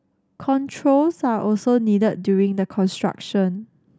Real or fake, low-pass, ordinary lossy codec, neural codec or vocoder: real; none; none; none